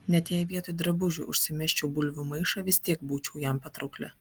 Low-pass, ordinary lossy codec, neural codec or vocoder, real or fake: 19.8 kHz; Opus, 24 kbps; none; real